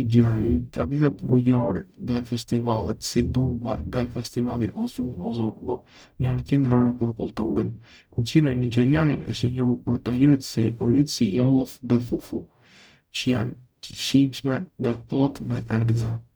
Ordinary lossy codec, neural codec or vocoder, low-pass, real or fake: none; codec, 44.1 kHz, 0.9 kbps, DAC; none; fake